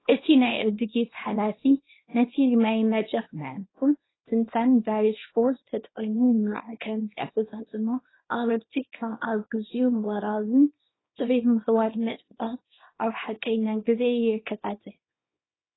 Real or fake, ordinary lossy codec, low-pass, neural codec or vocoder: fake; AAC, 16 kbps; 7.2 kHz; codec, 24 kHz, 0.9 kbps, WavTokenizer, small release